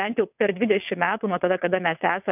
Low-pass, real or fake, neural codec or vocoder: 3.6 kHz; fake; vocoder, 22.05 kHz, 80 mel bands, WaveNeXt